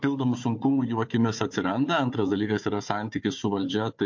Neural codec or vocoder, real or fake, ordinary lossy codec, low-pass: codec, 16 kHz, 4 kbps, FunCodec, trained on Chinese and English, 50 frames a second; fake; MP3, 48 kbps; 7.2 kHz